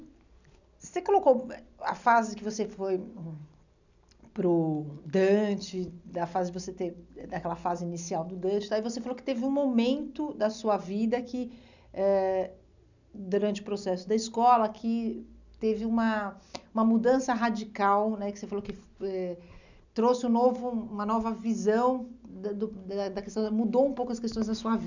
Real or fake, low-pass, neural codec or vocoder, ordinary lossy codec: real; 7.2 kHz; none; none